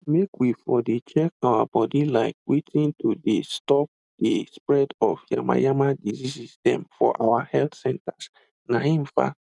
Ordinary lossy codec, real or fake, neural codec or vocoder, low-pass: none; real; none; 10.8 kHz